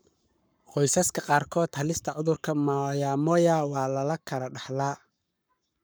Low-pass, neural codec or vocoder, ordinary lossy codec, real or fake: none; codec, 44.1 kHz, 7.8 kbps, Pupu-Codec; none; fake